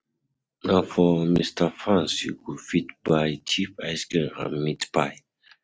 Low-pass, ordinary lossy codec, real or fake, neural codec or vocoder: none; none; real; none